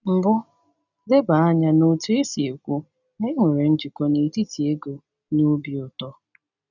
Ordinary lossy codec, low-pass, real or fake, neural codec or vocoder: none; 7.2 kHz; real; none